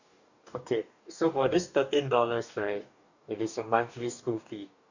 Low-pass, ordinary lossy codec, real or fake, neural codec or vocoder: 7.2 kHz; none; fake; codec, 44.1 kHz, 2.6 kbps, DAC